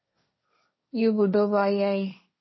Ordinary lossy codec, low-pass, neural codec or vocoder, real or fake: MP3, 24 kbps; 7.2 kHz; codec, 16 kHz, 1.1 kbps, Voila-Tokenizer; fake